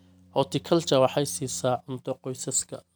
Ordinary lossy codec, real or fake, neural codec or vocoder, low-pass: none; real; none; none